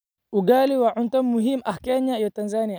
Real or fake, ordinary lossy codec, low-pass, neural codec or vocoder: real; none; none; none